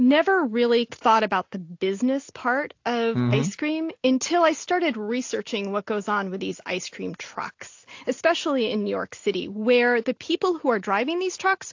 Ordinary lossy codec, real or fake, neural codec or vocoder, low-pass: AAC, 48 kbps; real; none; 7.2 kHz